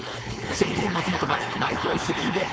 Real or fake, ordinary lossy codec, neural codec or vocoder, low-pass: fake; none; codec, 16 kHz, 4.8 kbps, FACodec; none